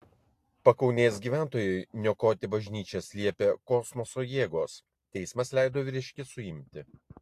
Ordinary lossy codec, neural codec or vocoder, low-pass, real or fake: AAC, 64 kbps; none; 14.4 kHz; real